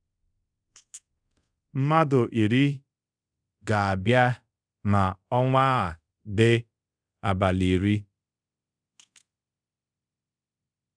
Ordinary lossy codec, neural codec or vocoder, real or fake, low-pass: none; codec, 24 kHz, 0.5 kbps, DualCodec; fake; 9.9 kHz